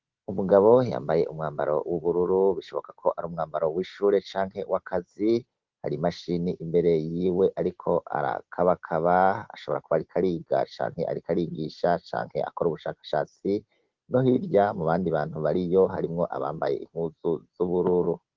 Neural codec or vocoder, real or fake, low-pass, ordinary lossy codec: none; real; 7.2 kHz; Opus, 16 kbps